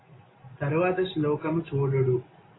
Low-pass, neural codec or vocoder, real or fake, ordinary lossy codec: 7.2 kHz; none; real; AAC, 16 kbps